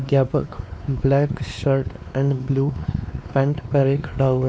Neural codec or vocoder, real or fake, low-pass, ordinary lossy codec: codec, 16 kHz, 2 kbps, X-Codec, WavLM features, trained on Multilingual LibriSpeech; fake; none; none